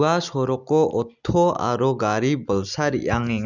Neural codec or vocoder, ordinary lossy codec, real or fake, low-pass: none; none; real; 7.2 kHz